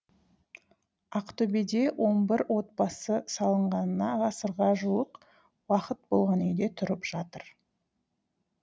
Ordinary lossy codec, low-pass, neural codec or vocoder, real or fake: none; none; none; real